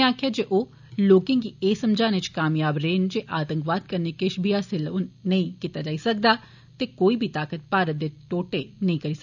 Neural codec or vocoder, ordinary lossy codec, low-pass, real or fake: none; none; 7.2 kHz; real